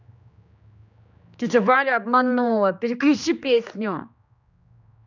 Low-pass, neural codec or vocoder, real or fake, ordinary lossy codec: 7.2 kHz; codec, 16 kHz, 2 kbps, X-Codec, HuBERT features, trained on general audio; fake; none